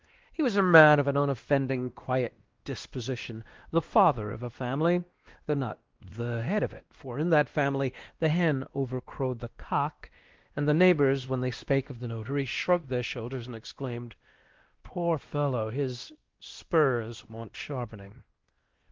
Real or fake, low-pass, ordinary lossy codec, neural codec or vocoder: fake; 7.2 kHz; Opus, 16 kbps; codec, 16 kHz, 1 kbps, X-Codec, WavLM features, trained on Multilingual LibriSpeech